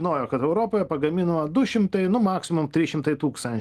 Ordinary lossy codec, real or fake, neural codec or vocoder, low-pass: Opus, 24 kbps; real; none; 14.4 kHz